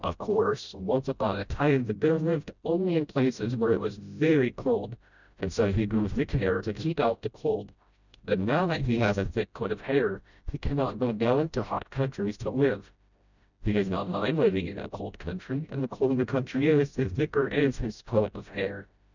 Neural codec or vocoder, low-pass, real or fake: codec, 16 kHz, 0.5 kbps, FreqCodec, smaller model; 7.2 kHz; fake